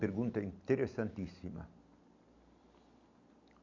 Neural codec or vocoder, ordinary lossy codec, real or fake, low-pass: none; none; real; 7.2 kHz